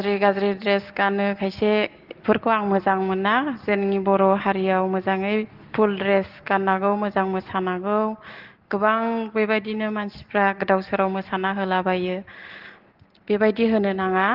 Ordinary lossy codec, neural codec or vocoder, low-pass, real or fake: Opus, 16 kbps; none; 5.4 kHz; real